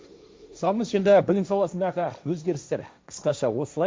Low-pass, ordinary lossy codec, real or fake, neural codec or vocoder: none; none; fake; codec, 16 kHz, 1.1 kbps, Voila-Tokenizer